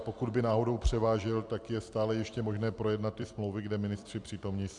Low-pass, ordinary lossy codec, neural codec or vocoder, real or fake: 10.8 kHz; MP3, 96 kbps; vocoder, 44.1 kHz, 128 mel bands every 256 samples, BigVGAN v2; fake